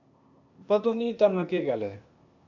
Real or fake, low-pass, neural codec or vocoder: fake; 7.2 kHz; codec, 16 kHz, 0.8 kbps, ZipCodec